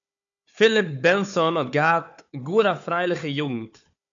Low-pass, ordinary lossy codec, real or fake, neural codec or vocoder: 7.2 kHz; MP3, 64 kbps; fake; codec, 16 kHz, 4 kbps, FunCodec, trained on Chinese and English, 50 frames a second